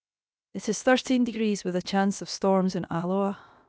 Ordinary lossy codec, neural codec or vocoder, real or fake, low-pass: none; codec, 16 kHz, 0.7 kbps, FocalCodec; fake; none